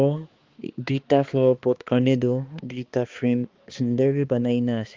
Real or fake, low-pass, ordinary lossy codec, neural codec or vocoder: fake; 7.2 kHz; Opus, 32 kbps; codec, 16 kHz, 2 kbps, X-Codec, HuBERT features, trained on balanced general audio